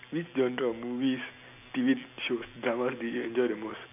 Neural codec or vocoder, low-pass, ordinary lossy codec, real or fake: none; 3.6 kHz; none; real